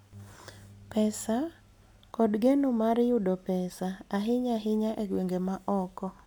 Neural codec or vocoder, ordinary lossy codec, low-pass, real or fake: none; none; 19.8 kHz; real